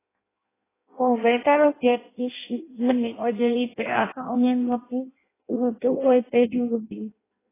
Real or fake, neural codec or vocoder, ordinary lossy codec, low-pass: fake; codec, 16 kHz in and 24 kHz out, 0.6 kbps, FireRedTTS-2 codec; AAC, 16 kbps; 3.6 kHz